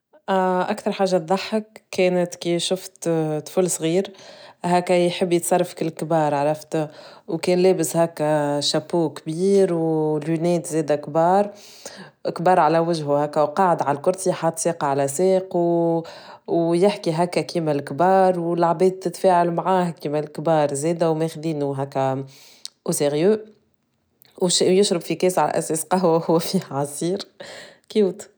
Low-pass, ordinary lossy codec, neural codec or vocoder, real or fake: none; none; none; real